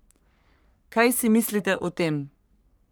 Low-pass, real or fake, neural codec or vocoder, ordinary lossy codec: none; fake; codec, 44.1 kHz, 3.4 kbps, Pupu-Codec; none